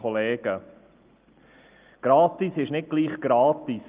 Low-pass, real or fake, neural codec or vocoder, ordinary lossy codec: 3.6 kHz; real; none; Opus, 32 kbps